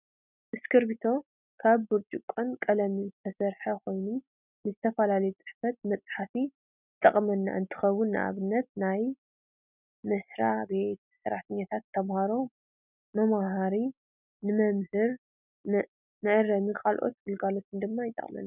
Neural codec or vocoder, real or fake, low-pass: none; real; 3.6 kHz